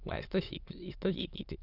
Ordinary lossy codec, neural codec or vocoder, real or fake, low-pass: none; autoencoder, 22.05 kHz, a latent of 192 numbers a frame, VITS, trained on many speakers; fake; 5.4 kHz